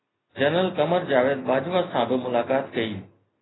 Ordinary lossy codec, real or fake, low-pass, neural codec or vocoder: AAC, 16 kbps; real; 7.2 kHz; none